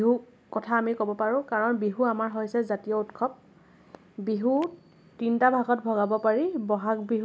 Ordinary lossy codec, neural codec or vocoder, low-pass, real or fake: none; none; none; real